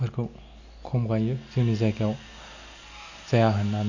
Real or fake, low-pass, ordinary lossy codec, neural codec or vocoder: real; 7.2 kHz; none; none